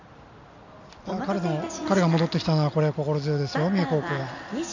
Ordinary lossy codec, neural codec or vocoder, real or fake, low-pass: none; none; real; 7.2 kHz